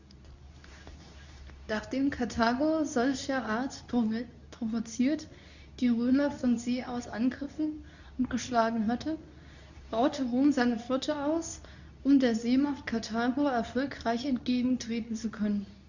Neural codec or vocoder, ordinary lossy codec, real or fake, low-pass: codec, 24 kHz, 0.9 kbps, WavTokenizer, medium speech release version 2; none; fake; 7.2 kHz